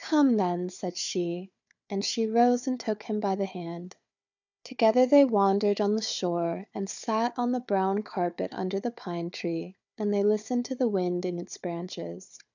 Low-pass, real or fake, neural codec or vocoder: 7.2 kHz; fake; codec, 16 kHz, 4 kbps, FunCodec, trained on Chinese and English, 50 frames a second